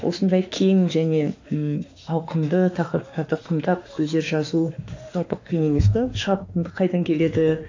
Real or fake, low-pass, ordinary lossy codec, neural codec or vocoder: fake; 7.2 kHz; AAC, 48 kbps; autoencoder, 48 kHz, 32 numbers a frame, DAC-VAE, trained on Japanese speech